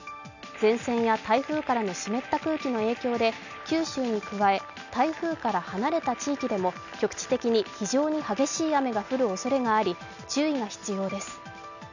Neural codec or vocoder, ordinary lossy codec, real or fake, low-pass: none; none; real; 7.2 kHz